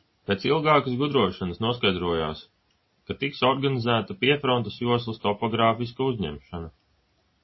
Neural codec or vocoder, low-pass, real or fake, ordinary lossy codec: none; 7.2 kHz; real; MP3, 24 kbps